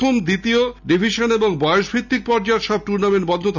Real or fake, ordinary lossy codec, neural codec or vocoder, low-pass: real; none; none; 7.2 kHz